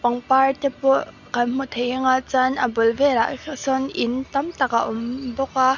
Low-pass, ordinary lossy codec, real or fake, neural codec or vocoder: 7.2 kHz; none; real; none